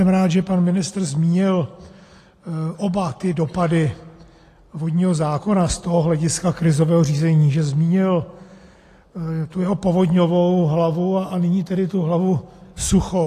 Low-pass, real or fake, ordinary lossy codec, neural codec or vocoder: 14.4 kHz; real; AAC, 48 kbps; none